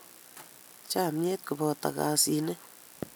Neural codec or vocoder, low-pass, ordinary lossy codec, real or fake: none; none; none; real